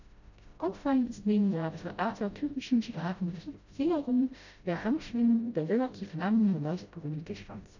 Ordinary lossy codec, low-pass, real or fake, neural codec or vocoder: none; 7.2 kHz; fake; codec, 16 kHz, 0.5 kbps, FreqCodec, smaller model